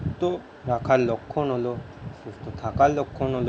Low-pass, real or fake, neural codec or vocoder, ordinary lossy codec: none; real; none; none